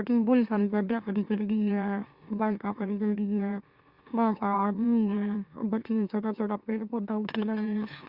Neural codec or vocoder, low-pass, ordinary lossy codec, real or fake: autoencoder, 44.1 kHz, a latent of 192 numbers a frame, MeloTTS; 5.4 kHz; Opus, 64 kbps; fake